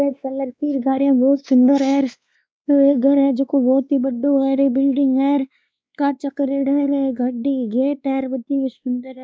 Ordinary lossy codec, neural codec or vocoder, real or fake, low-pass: none; codec, 16 kHz, 2 kbps, X-Codec, WavLM features, trained on Multilingual LibriSpeech; fake; none